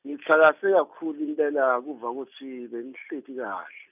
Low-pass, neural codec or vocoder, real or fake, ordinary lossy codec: 3.6 kHz; none; real; none